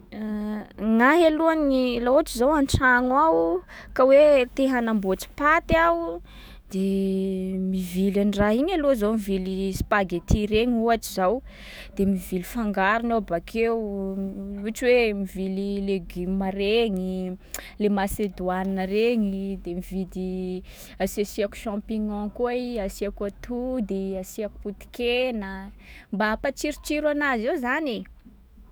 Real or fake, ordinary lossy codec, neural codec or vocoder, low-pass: fake; none; autoencoder, 48 kHz, 128 numbers a frame, DAC-VAE, trained on Japanese speech; none